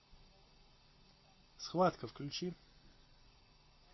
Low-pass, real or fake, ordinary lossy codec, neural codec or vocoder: 7.2 kHz; real; MP3, 24 kbps; none